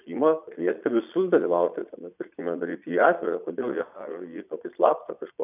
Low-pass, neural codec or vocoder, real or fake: 3.6 kHz; vocoder, 22.05 kHz, 80 mel bands, Vocos; fake